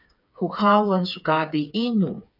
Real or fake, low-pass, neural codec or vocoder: fake; 5.4 kHz; codec, 16 kHz, 4 kbps, FreqCodec, smaller model